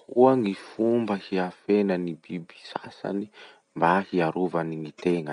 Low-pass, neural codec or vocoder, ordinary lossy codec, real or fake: 9.9 kHz; none; none; real